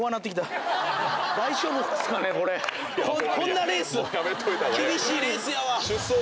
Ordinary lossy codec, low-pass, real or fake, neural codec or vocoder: none; none; real; none